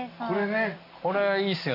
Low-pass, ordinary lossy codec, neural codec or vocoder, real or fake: 5.4 kHz; none; none; real